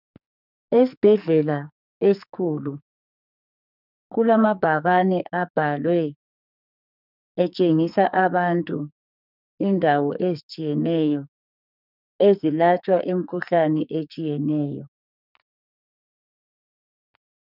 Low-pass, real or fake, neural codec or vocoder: 5.4 kHz; fake; codec, 44.1 kHz, 2.6 kbps, SNAC